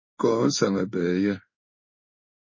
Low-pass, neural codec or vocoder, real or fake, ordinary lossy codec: 7.2 kHz; codec, 16 kHz in and 24 kHz out, 1 kbps, XY-Tokenizer; fake; MP3, 32 kbps